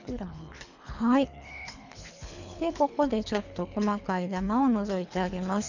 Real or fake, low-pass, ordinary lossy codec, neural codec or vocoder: fake; 7.2 kHz; none; codec, 24 kHz, 3 kbps, HILCodec